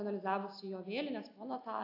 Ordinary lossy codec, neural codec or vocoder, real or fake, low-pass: AAC, 48 kbps; none; real; 5.4 kHz